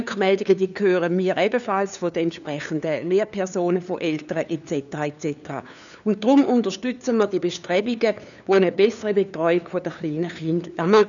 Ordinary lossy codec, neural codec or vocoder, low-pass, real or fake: none; codec, 16 kHz, 2 kbps, FunCodec, trained on LibriTTS, 25 frames a second; 7.2 kHz; fake